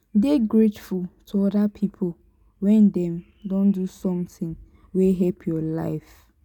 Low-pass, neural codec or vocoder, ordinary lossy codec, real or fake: 19.8 kHz; none; none; real